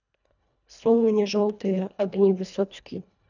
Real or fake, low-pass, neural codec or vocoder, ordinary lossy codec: fake; 7.2 kHz; codec, 24 kHz, 1.5 kbps, HILCodec; none